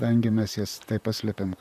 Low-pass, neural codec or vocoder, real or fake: 14.4 kHz; vocoder, 44.1 kHz, 128 mel bands, Pupu-Vocoder; fake